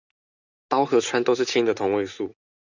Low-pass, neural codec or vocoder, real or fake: 7.2 kHz; none; real